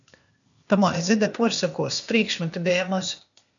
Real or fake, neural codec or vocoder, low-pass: fake; codec, 16 kHz, 0.8 kbps, ZipCodec; 7.2 kHz